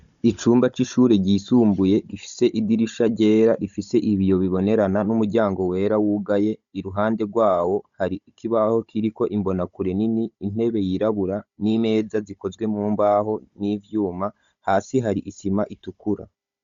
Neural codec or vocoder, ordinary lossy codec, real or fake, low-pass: codec, 16 kHz, 16 kbps, FunCodec, trained on Chinese and English, 50 frames a second; Opus, 64 kbps; fake; 7.2 kHz